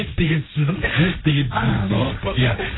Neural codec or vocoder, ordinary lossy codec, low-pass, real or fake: codec, 16 kHz, 1.1 kbps, Voila-Tokenizer; AAC, 16 kbps; 7.2 kHz; fake